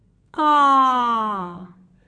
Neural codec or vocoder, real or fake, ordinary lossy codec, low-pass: codec, 32 kHz, 1.9 kbps, SNAC; fake; MP3, 48 kbps; 9.9 kHz